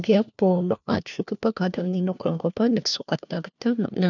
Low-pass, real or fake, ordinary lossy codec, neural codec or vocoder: 7.2 kHz; fake; none; codec, 16 kHz, 1 kbps, FunCodec, trained on LibriTTS, 50 frames a second